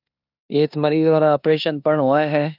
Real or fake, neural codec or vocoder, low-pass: fake; codec, 16 kHz in and 24 kHz out, 0.9 kbps, LongCat-Audio-Codec, four codebook decoder; 5.4 kHz